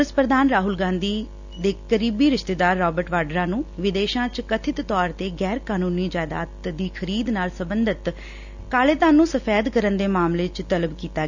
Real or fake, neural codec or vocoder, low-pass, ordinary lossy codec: real; none; 7.2 kHz; none